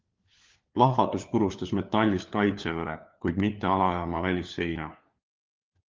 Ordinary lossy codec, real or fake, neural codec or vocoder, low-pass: Opus, 24 kbps; fake; codec, 16 kHz, 4 kbps, FunCodec, trained on LibriTTS, 50 frames a second; 7.2 kHz